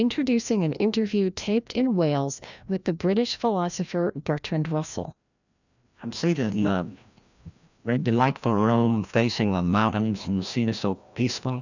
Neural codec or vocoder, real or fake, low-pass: codec, 16 kHz, 1 kbps, FreqCodec, larger model; fake; 7.2 kHz